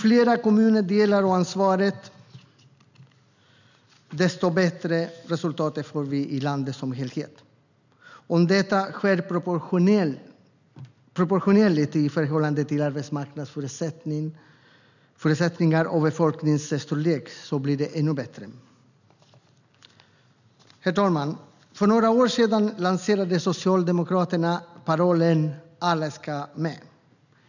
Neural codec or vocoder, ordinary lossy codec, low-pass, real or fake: none; none; 7.2 kHz; real